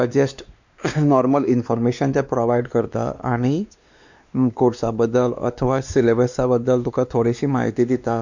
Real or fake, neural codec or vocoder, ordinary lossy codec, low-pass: fake; codec, 16 kHz, 2 kbps, X-Codec, WavLM features, trained on Multilingual LibriSpeech; none; 7.2 kHz